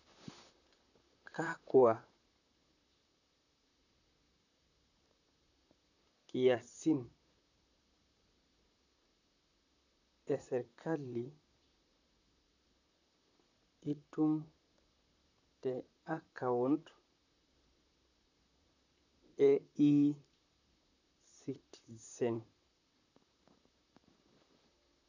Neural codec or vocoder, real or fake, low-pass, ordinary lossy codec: vocoder, 44.1 kHz, 128 mel bands, Pupu-Vocoder; fake; 7.2 kHz; none